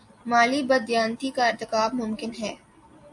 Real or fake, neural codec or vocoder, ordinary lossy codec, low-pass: real; none; AAC, 64 kbps; 10.8 kHz